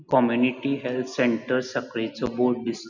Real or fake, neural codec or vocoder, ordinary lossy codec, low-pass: real; none; none; 7.2 kHz